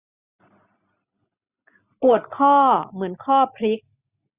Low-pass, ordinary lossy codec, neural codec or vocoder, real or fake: 3.6 kHz; Opus, 64 kbps; none; real